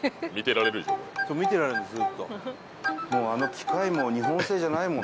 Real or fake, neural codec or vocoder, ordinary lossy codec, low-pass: real; none; none; none